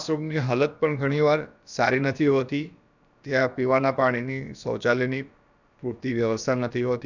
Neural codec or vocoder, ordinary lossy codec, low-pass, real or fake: codec, 16 kHz, about 1 kbps, DyCAST, with the encoder's durations; none; 7.2 kHz; fake